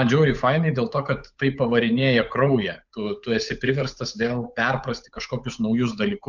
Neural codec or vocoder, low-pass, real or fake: codec, 16 kHz, 8 kbps, FunCodec, trained on Chinese and English, 25 frames a second; 7.2 kHz; fake